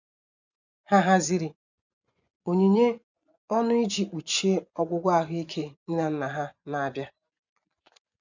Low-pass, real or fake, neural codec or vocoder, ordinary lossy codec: 7.2 kHz; fake; vocoder, 24 kHz, 100 mel bands, Vocos; none